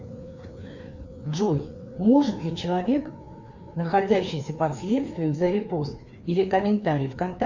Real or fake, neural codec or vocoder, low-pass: fake; codec, 16 kHz, 2 kbps, FreqCodec, larger model; 7.2 kHz